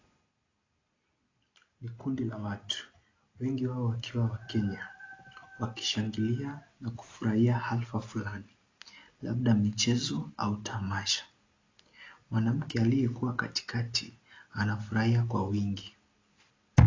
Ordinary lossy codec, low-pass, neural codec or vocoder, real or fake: AAC, 32 kbps; 7.2 kHz; none; real